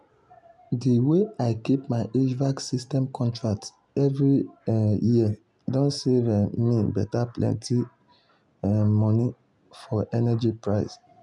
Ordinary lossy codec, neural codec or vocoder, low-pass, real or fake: none; none; 10.8 kHz; real